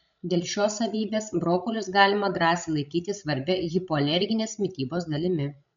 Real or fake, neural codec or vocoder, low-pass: fake; codec, 16 kHz, 16 kbps, FreqCodec, larger model; 7.2 kHz